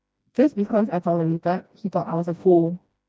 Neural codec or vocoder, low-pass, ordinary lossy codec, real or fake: codec, 16 kHz, 1 kbps, FreqCodec, smaller model; none; none; fake